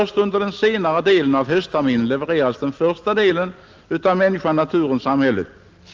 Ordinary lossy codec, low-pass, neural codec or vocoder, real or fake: Opus, 24 kbps; 7.2 kHz; none; real